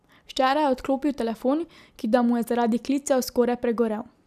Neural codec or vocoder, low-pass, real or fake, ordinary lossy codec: none; 14.4 kHz; real; none